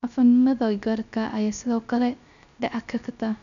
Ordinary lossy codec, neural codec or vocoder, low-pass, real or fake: none; codec, 16 kHz, 0.3 kbps, FocalCodec; 7.2 kHz; fake